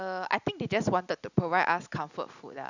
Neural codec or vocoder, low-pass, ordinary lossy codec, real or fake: none; 7.2 kHz; none; real